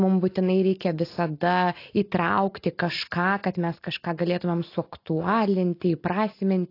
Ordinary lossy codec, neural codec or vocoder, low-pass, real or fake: AAC, 32 kbps; none; 5.4 kHz; real